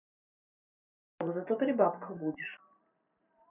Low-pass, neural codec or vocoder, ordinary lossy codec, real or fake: 3.6 kHz; none; none; real